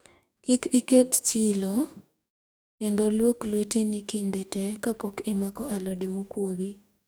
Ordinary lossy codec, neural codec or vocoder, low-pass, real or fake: none; codec, 44.1 kHz, 2.6 kbps, DAC; none; fake